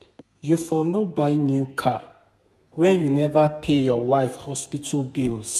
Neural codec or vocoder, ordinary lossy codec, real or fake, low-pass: codec, 32 kHz, 1.9 kbps, SNAC; MP3, 96 kbps; fake; 14.4 kHz